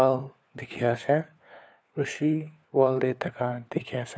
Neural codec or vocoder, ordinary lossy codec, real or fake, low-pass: codec, 16 kHz, 4 kbps, FunCodec, trained on LibriTTS, 50 frames a second; none; fake; none